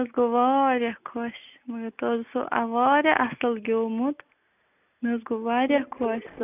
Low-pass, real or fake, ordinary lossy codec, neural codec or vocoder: 3.6 kHz; real; none; none